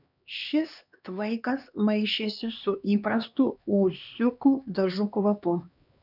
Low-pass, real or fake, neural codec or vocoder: 5.4 kHz; fake; codec, 16 kHz, 2 kbps, X-Codec, HuBERT features, trained on LibriSpeech